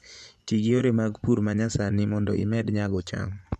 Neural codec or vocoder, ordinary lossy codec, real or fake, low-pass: vocoder, 24 kHz, 100 mel bands, Vocos; none; fake; none